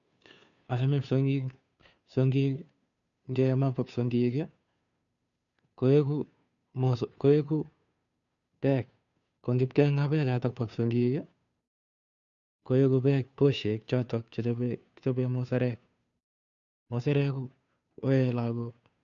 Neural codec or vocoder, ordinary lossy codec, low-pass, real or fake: codec, 16 kHz, 2 kbps, FunCodec, trained on Chinese and English, 25 frames a second; none; 7.2 kHz; fake